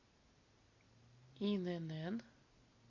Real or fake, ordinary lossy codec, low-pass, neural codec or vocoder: real; AAC, 48 kbps; 7.2 kHz; none